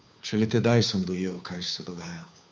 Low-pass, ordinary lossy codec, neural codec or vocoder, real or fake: none; none; codec, 16 kHz, 2 kbps, FunCodec, trained on Chinese and English, 25 frames a second; fake